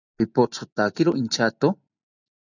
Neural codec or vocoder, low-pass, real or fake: none; 7.2 kHz; real